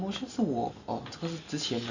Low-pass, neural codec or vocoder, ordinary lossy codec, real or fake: 7.2 kHz; none; Opus, 64 kbps; real